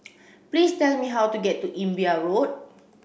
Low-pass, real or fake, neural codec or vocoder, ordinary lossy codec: none; real; none; none